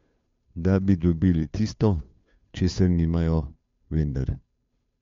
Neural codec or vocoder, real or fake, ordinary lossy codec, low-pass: codec, 16 kHz, 2 kbps, FunCodec, trained on Chinese and English, 25 frames a second; fake; MP3, 48 kbps; 7.2 kHz